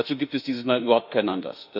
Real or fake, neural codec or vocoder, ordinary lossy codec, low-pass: fake; autoencoder, 48 kHz, 32 numbers a frame, DAC-VAE, trained on Japanese speech; none; 5.4 kHz